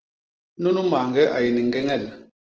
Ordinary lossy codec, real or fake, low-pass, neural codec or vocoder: Opus, 16 kbps; real; 7.2 kHz; none